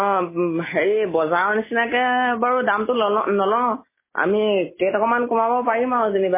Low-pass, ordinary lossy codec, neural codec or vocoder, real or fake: 3.6 kHz; MP3, 16 kbps; none; real